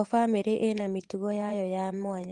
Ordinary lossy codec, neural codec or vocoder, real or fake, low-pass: Opus, 24 kbps; vocoder, 24 kHz, 100 mel bands, Vocos; fake; 10.8 kHz